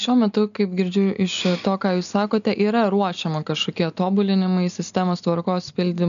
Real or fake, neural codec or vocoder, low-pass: real; none; 7.2 kHz